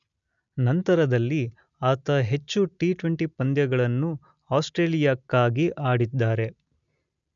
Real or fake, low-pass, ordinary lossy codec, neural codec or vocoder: real; 7.2 kHz; none; none